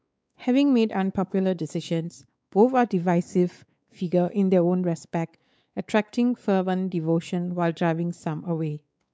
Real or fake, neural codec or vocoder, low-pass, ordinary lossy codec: fake; codec, 16 kHz, 4 kbps, X-Codec, WavLM features, trained on Multilingual LibriSpeech; none; none